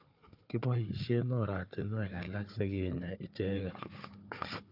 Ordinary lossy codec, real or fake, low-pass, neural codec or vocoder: AAC, 48 kbps; fake; 5.4 kHz; codec, 16 kHz, 4 kbps, FunCodec, trained on Chinese and English, 50 frames a second